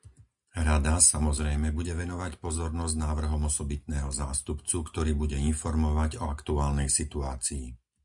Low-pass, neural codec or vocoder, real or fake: 10.8 kHz; none; real